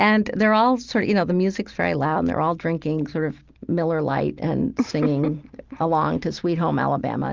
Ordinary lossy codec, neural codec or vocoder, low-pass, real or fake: Opus, 32 kbps; none; 7.2 kHz; real